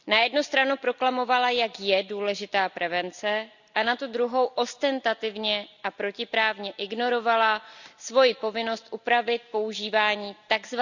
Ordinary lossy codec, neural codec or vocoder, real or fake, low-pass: none; none; real; 7.2 kHz